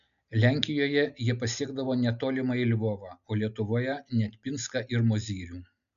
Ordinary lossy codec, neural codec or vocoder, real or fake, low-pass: MP3, 96 kbps; none; real; 7.2 kHz